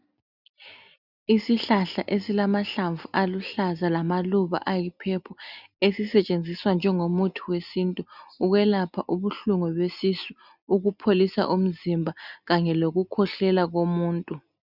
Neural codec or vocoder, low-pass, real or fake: none; 5.4 kHz; real